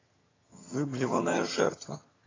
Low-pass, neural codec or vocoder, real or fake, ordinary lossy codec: 7.2 kHz; vocoder, 22.05 kHz, 80 mel bands, HiFi-GAN; fake; AAC, 32 kbps